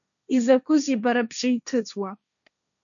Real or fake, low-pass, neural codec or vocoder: fake; 7.2 kHz; codec, 16 kHz, 1.1 kbps, Voila-Tokenizer